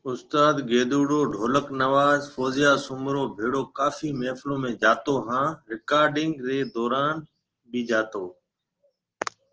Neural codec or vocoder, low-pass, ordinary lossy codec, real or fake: none; 7.2 kHz; Opus, 16 kbps; real